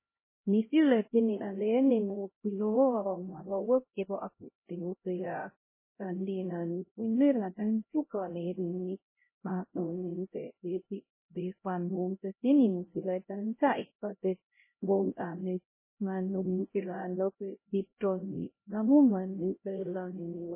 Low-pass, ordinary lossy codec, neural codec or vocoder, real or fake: 3.6 kHz; MP3, 16 kbps; codec, 16 kHz, 0.5 kbps, X-Codec, HuBERT features, trained on LibriSpeech; fake